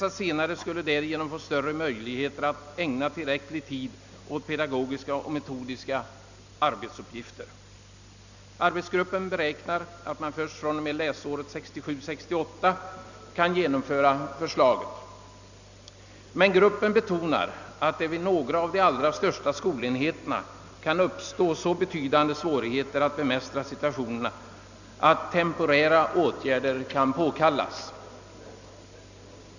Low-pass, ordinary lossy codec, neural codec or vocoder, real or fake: 7.2 kHz; none; none; real